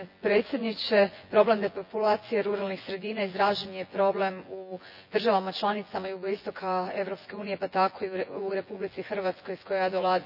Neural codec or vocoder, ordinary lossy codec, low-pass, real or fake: vocoder, 24 kHz, 100 mel bands, Vocos; none; 5.4 kHz; fake